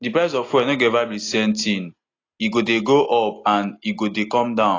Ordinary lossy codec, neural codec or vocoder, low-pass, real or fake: AAC, 32 kbps; none; 7.2 kHz; real